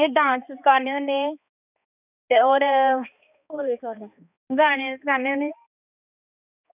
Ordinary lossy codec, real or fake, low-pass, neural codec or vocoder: none; fake; 3.6 kHz; codec, 16 kHz, 2 kbps, X-Codec, HuBERT features, trained on balanced general audio